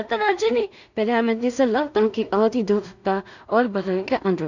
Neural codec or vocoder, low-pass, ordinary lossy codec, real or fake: codec, 16 kHz in and 24 kHz out, 0.4 kbps, LongCat-Audio-Codec, two codebook decoder; 7.2 kHz; none; fake